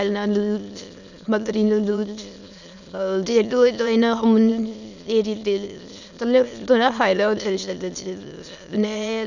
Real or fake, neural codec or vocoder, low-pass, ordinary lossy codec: fake; autoencoder, 22.05 kHz, a latent of 192 numbers a frame, VITS, trained on many speakers; 7.2 kHz; none